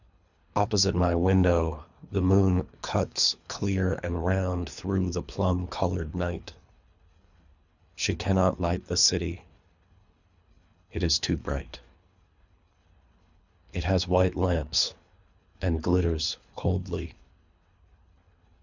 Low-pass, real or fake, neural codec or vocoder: 7.2 kHz; fake; codec, 24 kHz, 3 kbps, HILCodec